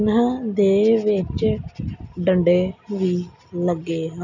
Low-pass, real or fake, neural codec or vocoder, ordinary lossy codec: 7.2 kHz; real; none; none